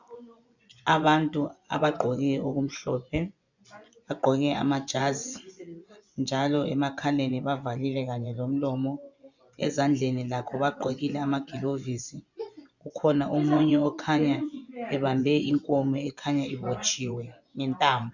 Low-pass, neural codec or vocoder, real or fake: 7.2 kHz; vocoder, 24 kHz, 100 mel bands, Vocos; fake